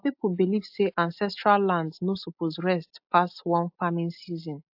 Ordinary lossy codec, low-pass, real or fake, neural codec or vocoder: AAC, 48 kbps; 5.4 kHz; real; none